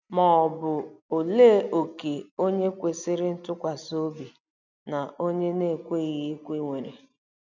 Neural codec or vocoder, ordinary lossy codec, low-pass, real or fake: none; none; 7.2 kHz; real